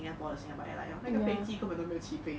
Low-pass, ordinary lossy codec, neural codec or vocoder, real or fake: none; none; none; real